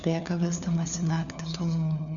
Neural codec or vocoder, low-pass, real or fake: codec, 16 kHz, 4 kbps, FunCodec, trained on LibriTTS, 50 frames a second; 7.2 kHz; fake